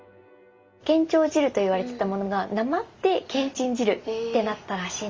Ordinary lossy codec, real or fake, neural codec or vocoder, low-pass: Opus, 32 kbps; real; none; 7.2 kHz